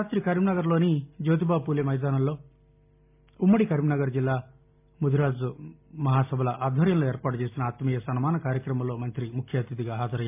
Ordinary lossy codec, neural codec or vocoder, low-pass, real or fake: MP3, 24 kbps; none; 3.6 kHz; real